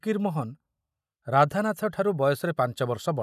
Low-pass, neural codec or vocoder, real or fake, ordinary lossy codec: 14.4 kHz; none; real; none